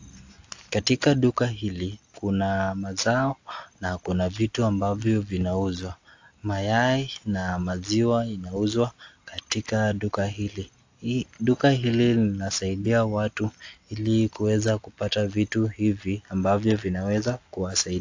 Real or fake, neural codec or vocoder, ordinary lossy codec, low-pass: real; none; AAC, 48 kbps; 7.2 kHz